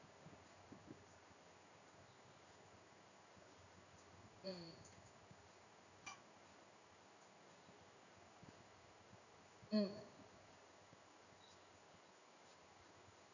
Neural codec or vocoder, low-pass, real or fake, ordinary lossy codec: codec, 16 kHz in and 24 kHz out, 1 kbps, XY-Tokenizer; 7.2 kHz; fake; none